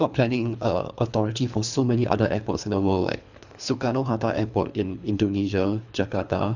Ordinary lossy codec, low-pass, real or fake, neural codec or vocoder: none; 7.2 kHz; fake; codec, 24 kHz, 3 kbps, HILCodec